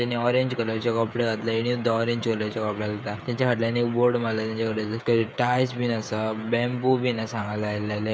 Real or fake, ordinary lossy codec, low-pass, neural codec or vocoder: fake; none; none; codec, 16 kHz, 16 kbps, FreqCodec, smaller model